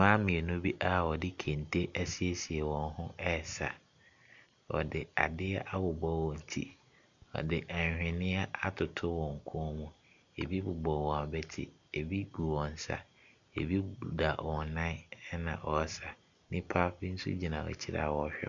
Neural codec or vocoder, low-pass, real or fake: none; 7.2 kHz; real